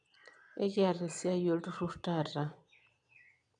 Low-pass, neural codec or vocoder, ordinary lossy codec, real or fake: 9.9 kHz; vocoder, 22.05 kHz, 80 mel bands, Vocos; none; fake